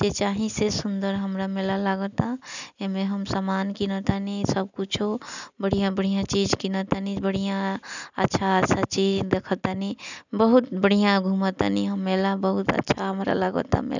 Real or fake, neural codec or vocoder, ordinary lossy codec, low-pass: real; none; none; 7.2 kHz